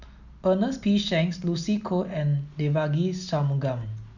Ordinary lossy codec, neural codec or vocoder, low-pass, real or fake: none; none; 7.2 kHz; real